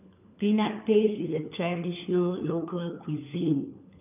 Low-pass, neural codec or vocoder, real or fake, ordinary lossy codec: 3.6 kHz; codec, 16 kHz, 4 kbps, FunCodec, trained on LibriTTS, 50 frames a second; fake; none